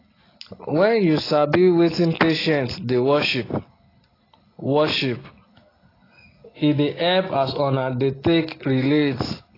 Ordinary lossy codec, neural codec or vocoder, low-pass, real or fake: AAC, 24 kbps; none; 5.4 kHz; real